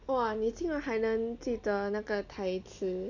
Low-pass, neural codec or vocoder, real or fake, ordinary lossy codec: 7.2 kHz; none; real; none